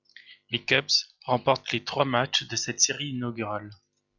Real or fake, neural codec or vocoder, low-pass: real; none; 7.2 kHz